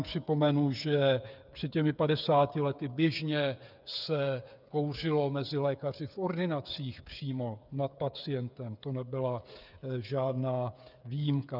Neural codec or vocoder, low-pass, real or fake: codec, 16 kHz, 8 kbps, FreqCodec, smaller model; 5.4 kHz; fake